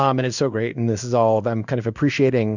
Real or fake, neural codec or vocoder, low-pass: fake; codec, 16 kHz in and 24 kHz out, 1 kbps, XY-Tokenizer; 7.2 kHz